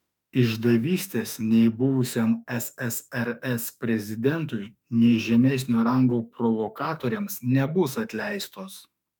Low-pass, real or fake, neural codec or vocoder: 19.8 kHz; fake; autoencoder, 48 kHz, 32 numbers a frame, DAC-VAE, trained on Japanese speech